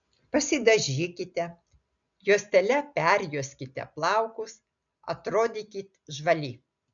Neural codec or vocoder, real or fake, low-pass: none; real; 7.2 kHz